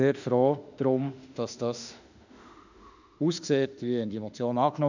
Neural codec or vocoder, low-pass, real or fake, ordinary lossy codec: autoencoder, 48 kHz, 32 numbers a frame, DAC-VAE, trained on Japanese speech; 7.2 kHz; fake; none